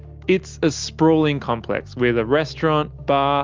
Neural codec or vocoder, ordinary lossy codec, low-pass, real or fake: none; Opus, 32 kbps; 7.2 kHz; real